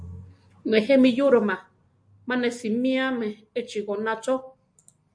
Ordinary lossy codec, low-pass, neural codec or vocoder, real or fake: MP3, 64 kbps; 9.9 kHz; none; real